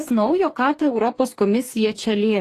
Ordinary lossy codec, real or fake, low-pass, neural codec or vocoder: AAC, 48 kbps; fake; 14.4 kHz; codec, 44.1 kHz, 2.6 kbps, DAC